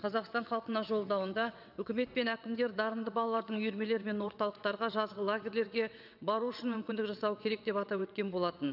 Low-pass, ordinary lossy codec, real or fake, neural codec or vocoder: 5.4 kHz; none; fake; vocoder, 22.05 kHz, 80 mel bands, WaveNeXt